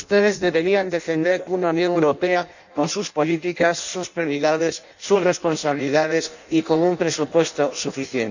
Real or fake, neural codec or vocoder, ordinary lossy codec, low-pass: fake; codec, 16 kHz in and 24 kHz out, 0.6 kbps, FireRedTTS-2 codec; none; 7.2 kHz